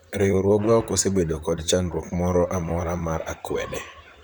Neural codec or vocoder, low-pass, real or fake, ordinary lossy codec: vocoder, 44.1 kHz, 128 mel bands, Pupu-Vocoder; none; fake; none